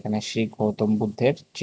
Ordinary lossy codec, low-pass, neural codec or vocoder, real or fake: none; none; none; real